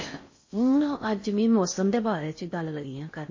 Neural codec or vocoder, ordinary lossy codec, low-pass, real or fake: codec, 16 kHz in and 24 kHz out, 0.6 kbps, FocalCodec, streaming, 4096 codes; MP3, 32 kbps; 7.2 kHz; fake